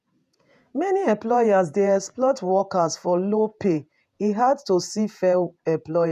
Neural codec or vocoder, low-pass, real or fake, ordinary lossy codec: vocoder, 48 kHz, 128 mel bands, Vocos; 14.4 kHz; fake; none